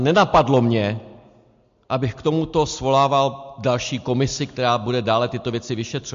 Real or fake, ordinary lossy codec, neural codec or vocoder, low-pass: real; MP3, 48 kbps; none; 7.2 kHz